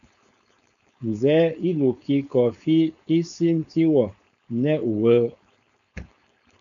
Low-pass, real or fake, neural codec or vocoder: 7.2 kHz; fake; codec, 16 kHz, 4.8 kbps, FACodec